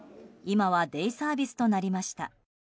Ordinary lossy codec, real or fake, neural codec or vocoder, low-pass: none; real; none; none